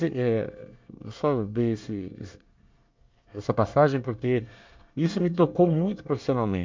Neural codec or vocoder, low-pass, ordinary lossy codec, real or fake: codec, 24 kHz, 1 kbps, SNAC; 7.2 kHz; MP3, 64 kbps; fake